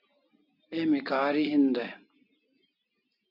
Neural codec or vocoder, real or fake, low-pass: none; real; 5.4 kHz